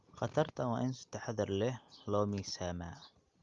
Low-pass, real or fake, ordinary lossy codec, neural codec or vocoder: 7.2 kHz; real; Opus, 32 kbps; none